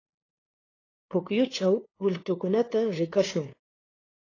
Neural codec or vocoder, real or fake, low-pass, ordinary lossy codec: codec, 16 kHz, 2 kbps, FunCodec, trained on LibriTTS, 25 frames a second; fake; 7.2 kHz; AAC, 32 kbps